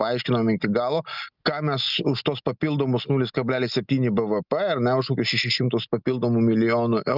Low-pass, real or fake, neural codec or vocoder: 5.4 kHz; real; none